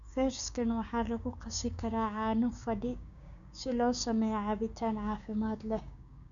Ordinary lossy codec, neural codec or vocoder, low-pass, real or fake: none; codec, 16 kHz, 6 kbps, DAC; 7.2 kHz; fake